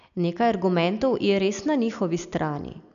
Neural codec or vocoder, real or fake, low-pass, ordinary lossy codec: none; real; 7.2 kHz; none